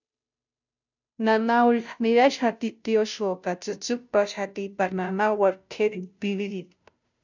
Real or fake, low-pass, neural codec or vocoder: fake; 7.2 kHz; codec, 16 kHz, 0.5 kbps, FunCodec, trained on Chinese and English, 25 frames a second